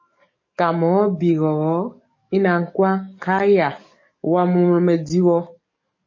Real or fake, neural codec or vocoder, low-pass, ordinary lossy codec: fake; codec, 44.1 kHz, 7.8 kbps, DAC; 7.2 kHz; MP3, 32 kbps